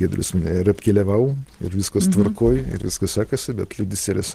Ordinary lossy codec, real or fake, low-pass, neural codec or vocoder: Opus, 16 kbps; real; 14.4 kHz; none